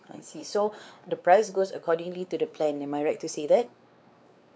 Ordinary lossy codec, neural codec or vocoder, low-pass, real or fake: none; codec, 16 kHz, 4 kbps, X-Codec, WavLM features, trained on Multilingual LibriSpeech; none; fake